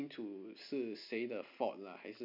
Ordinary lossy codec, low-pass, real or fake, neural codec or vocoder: MP3, 48 kbps; 5.4 kHz; real; none